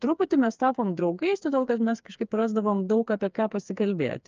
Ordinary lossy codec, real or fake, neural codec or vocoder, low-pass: Opus, 24 kbps; fake; codec, 16 kHz, 4 kbps, FreqCodec, smaller model; 7.2 kHz